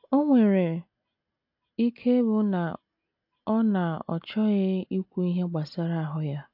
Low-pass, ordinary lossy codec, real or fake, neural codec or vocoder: 5.4 kHz; AAC, 48 kbps; real; none